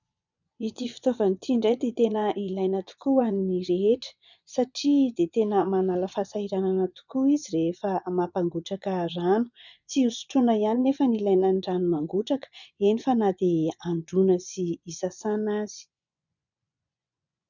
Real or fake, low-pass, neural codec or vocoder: fake; 7.2 kHz; vocoder, 24 kHz, 100 mel bands, Vocos